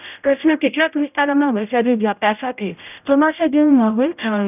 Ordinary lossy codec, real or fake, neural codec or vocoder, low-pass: none; fake; codec, 16 kHz, 0.5 kbps, X-Codec, HuBERT features, trained on general audio; 3.6 kHz